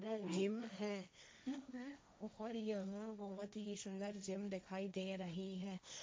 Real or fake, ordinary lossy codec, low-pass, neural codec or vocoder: fake; none; none; codec, 16 kHz, 1.1 kbps, Voila-Tokenizer